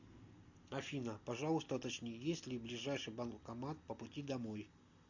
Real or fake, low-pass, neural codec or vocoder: real; 7.2 kHz; none